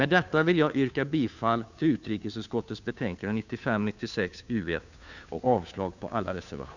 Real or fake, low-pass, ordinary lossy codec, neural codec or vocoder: fake; 7.2 kHz; none; codec, 16 kHz, 2 kbps, FunCodec, trained on Chinese and English, 25 frames a second